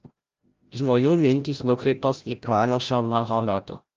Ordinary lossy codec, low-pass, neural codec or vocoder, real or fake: Opus, 32 kbps; 7.2 kHz; codec, 16 kHz, 0.5 kbps, FreqCodec, larger model; fake